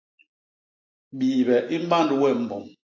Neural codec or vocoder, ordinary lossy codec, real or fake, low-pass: none; AAC, 32 kbps; real; 7.2 kHz